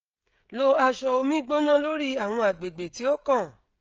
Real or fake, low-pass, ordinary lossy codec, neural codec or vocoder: fake; 7.2 kHz; Opus, 24 kbps; codec, 16 kHz, 16 kbps, FreqCodec, smaller model